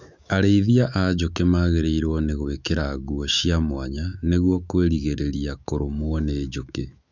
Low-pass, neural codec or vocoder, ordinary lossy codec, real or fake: 7.2 kHz; autoencoder, 48 kHz, 128 numbers a frame, DAC-VAE, trained on Japanese speech; none; fake